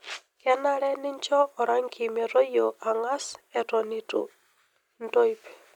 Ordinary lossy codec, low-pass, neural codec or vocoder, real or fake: none; 19.8 kHz; none; real